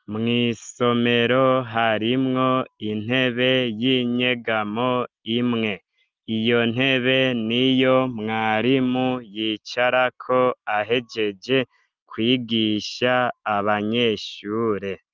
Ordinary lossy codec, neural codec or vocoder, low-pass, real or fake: Opus, 32 kbps; none; 7.2 kHz; real